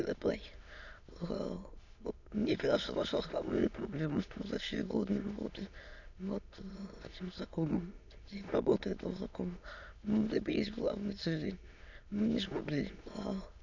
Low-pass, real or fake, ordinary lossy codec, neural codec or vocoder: 7.2 kHz; fake; none; autoencoder, 22.05 kHz, a latent of 192 numbers a frame, VITS, trained on many speakers